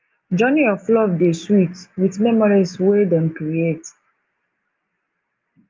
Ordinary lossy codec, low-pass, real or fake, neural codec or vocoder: Opus, 24 kbps; 7.2 kHz; real; none